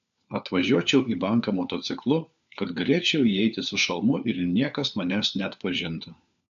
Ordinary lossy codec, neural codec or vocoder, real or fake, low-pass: AAC, 96 kbps; codec, 16 kHz, 4 kbps, FunCodec, trained on LibriTTS, 50 frames a second; fake; 7.2 kHz